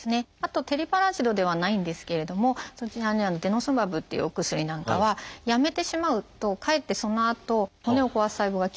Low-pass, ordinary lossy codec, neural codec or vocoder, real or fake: none; none; none; real